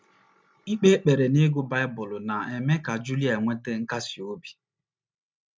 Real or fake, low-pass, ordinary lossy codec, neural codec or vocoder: real; none; none; none